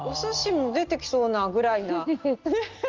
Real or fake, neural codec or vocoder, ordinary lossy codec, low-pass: real; none; Opus, 32 kbps; 7.2 kHz